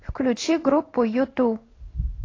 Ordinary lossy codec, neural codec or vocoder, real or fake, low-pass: AAC, 32 kbps; codec, 16 kHz in and 24 kHz out, 1 kbps, XY-Tokenizer; fake; 7.2 kHz